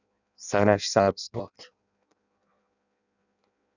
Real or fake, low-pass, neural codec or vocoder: fake; 7.2 kHz; codec, 16 kHz in and 24 kHz out, 0.6 kbps, FireRedTTS-2 codec